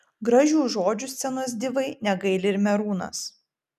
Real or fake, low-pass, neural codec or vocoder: real; 14.4 kHz; none